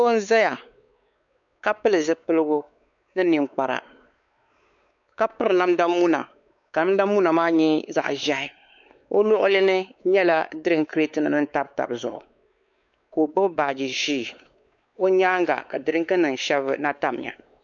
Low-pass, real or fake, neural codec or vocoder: 7.2 kHz; fake; codec, 16 kHz, 4 kbps, X-Codec, WavLM features, trained on Multilingual LibriSpeech